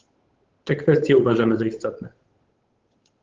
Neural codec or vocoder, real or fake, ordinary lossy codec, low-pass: codec, 16 kHz, 8 kbps, FunCodec, trained on Chinese and English, 25 frames a second; fake; Opus, 16 kbps; 7.2 kHz